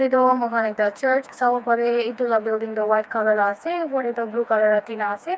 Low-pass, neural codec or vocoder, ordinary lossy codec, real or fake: none; codec, 16 kHz, 2 kbps, FreqCodec, smaller model; none; fake